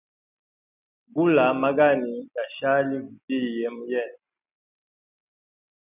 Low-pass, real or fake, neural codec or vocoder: 3.6 kHz; real; none